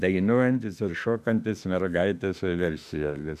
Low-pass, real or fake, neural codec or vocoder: 14.4 kHz; fake; autoencoder, 48 kHz, 32 numbers a frame, DAC-VAE, trained on Japanese speech